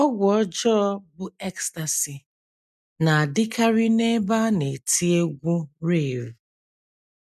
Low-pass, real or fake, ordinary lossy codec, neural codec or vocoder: 14.4 kHz; real; none; none